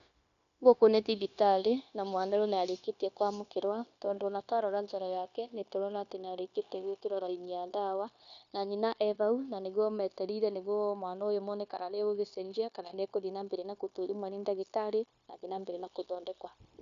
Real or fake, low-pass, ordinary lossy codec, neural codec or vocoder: fake; 7.2 kHz; none; codec, 16 kHz, 0.9 kbps, LongCat-Audio-Codec